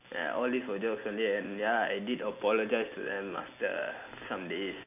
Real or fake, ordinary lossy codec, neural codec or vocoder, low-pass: real; none; none; 3.6 kHz